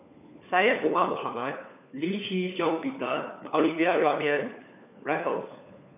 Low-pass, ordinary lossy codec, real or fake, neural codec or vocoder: 3.6 kHz; none; fake; codec, 16 kHz, 4 kbps, FunCodec, trained on LibriTTS, 50 frames a second